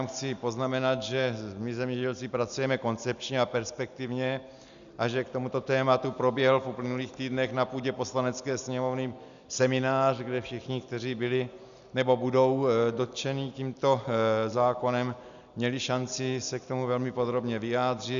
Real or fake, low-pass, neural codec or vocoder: real; 7.2 kHz; none